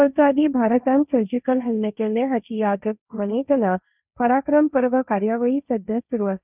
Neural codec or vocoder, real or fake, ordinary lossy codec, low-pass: codec, 16 kHz, 1.1 kbps, Voila-Tokenizer; fake; none; 3.6 kHz